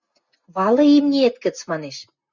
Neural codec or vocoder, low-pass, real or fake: none; 7.2 kHz; real